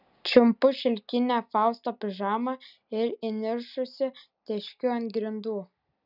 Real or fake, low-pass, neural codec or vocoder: real; 5.4 kHz; none